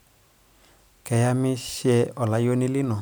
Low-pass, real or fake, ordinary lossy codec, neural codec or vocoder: none; real; none; none